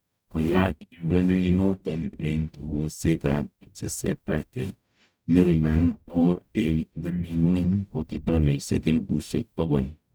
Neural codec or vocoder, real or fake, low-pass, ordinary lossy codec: codec, 44.1 kHz, 0.9 kbps, DAC; fake; none; none